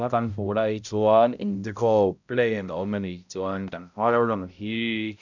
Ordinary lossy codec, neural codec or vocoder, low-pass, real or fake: none; codec, 16 kHz, 0.5 kbps, X-Codec, HuBERT features, trained on balanced general audio; 7.2 kHz; fake